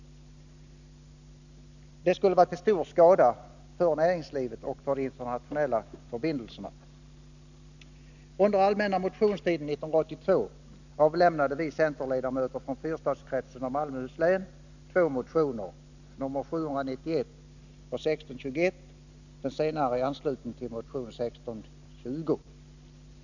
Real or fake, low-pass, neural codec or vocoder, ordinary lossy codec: real; 7.2 kHz; none; none